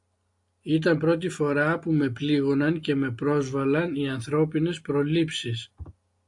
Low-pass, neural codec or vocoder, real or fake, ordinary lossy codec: 10.8 kHz; none; real; AAC, 64 kbps